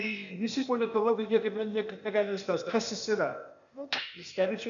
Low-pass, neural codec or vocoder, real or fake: 7.2 kHz; codec, 16 kHz, 0.8 kbps, ZipCodec; fake